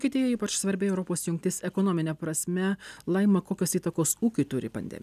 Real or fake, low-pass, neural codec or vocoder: real; 14.4 kHz; none